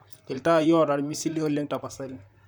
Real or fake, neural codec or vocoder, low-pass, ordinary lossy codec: fake; vocoder, 44.1 kHz, 128 mel bands, Pupu-Vocoder; none; none